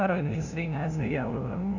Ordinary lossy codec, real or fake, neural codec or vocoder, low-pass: none; fake; codec, 16 kHz, 0.5 kbps, FunCodec, trained on LibriTTS, 25 frames a second; 7.2 kHz